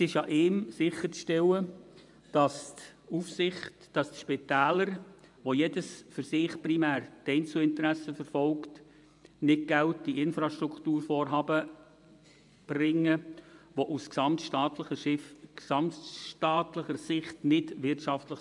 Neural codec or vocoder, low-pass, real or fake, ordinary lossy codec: vocoder, 24 kHz, 100 mel bands, Vocos; 10.8 kHz; fake; none